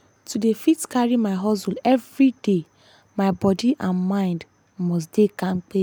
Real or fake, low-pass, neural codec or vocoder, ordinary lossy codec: real; none; none; none